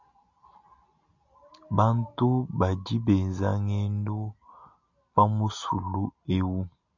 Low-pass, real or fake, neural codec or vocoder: 7.2 kHz; real; none